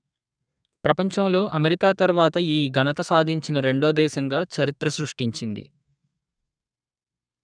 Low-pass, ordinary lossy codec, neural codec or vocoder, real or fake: 9.9 kHz; none; codec, 32 kHz, 1.9 kbps, SNAC; fake